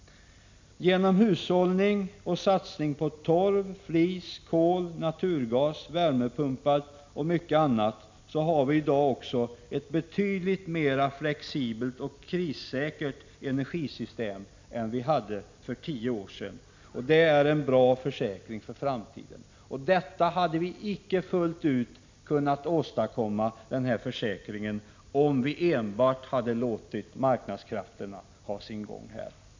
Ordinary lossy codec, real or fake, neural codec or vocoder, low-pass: MP3, 64 kbps; real; none; 7.2 kHz